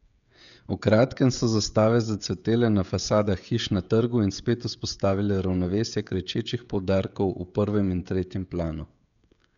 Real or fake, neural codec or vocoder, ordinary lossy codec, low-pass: fake; codec, 16 kHz, 16 kbps, FreqCodec, smaller model; none; 7.2 kHz